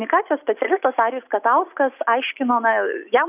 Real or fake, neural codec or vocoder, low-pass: real; none; 3.6 kHz